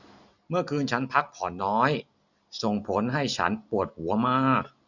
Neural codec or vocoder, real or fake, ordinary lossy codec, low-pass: none; real; none; 7.2 kHz